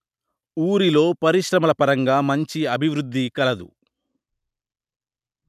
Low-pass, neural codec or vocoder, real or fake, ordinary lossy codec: 14.4 kHz; none; real; none